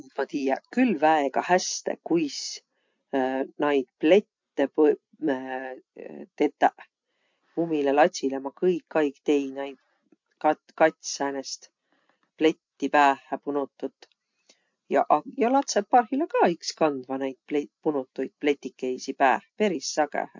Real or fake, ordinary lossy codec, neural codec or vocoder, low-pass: real; MP3, 48 kbps; none; 7.2 kHz